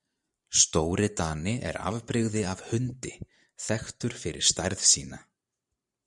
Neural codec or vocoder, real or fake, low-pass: vocoder, 44.1 kHz, 128 mel bands every 512 samples, BigVGAN v2; fake; 10.8 kHz